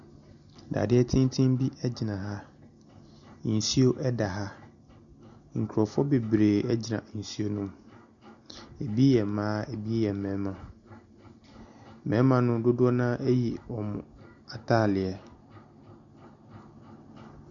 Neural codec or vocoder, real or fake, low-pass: none; real; 7.2 kHz